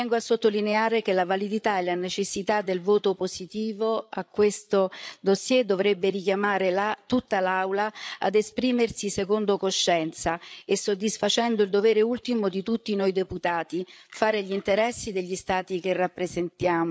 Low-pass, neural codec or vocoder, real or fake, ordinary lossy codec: none; codec, 16 kHz, 8 kbps, FreqCodec, larger model; fake; none